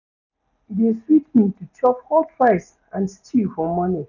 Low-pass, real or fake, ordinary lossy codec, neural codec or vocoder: 7.2 kHz; real; none; none